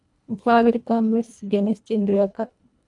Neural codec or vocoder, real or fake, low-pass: codec, 24 kHz, 1.5 kbps, HILCodec; fake; 10.8 kHz